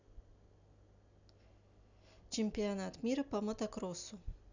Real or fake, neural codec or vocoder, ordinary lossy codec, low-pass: real; none; none; 7.2 kHz